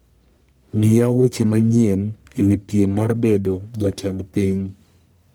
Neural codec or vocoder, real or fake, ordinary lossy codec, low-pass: codec, 44.1 kHz, 1.7 kbps, Pupu-Codec; fake; none; none